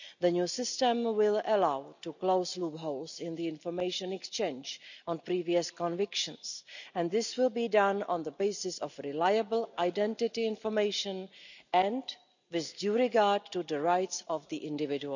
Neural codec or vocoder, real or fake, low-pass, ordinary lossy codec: none; real; 7.2 kHz; none